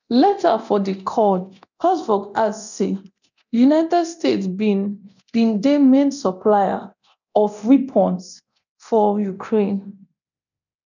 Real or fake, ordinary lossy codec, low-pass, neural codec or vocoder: fake; none; 7.2 kHz; codec, 24 kHz, 0.9 kbps, DualCodec